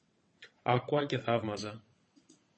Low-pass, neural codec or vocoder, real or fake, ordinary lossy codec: 9.9 kHz; vocoder, 22.05 kHz, 80 mel bands, WaveNeXt; fake; MP3, 32 kbps